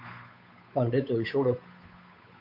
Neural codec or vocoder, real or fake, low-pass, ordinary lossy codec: codec, 16 kHz, 8 kbps, FunCodec, trained on Chinese and English, 25 frames a second; fake; 5.4 kHz; AAC, 48 kbps